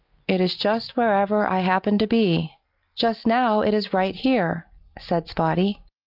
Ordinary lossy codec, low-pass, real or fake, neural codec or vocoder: Opus, 32 kbps; 5.4 kHz; real; none